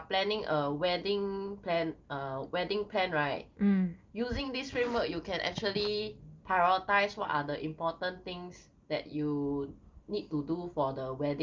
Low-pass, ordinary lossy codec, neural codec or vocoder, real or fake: 7.2 kHz; Opus, 32 kbps; none; real